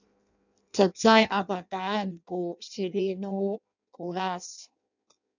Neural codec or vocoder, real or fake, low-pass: codec, 16 kHz in and 24 kHz out, 0.6 kbps, FireRedTTS-2 codec; fake; 7.2 kHz